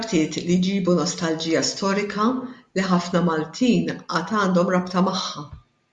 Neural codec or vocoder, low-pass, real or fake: none; 10.8 kHz; real